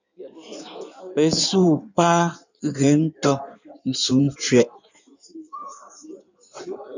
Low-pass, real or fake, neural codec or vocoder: 7.2 kHz; fake; codec, 16 kHz in and 24 kHz out, 1.1 kbps, FireRedTTS-2 codec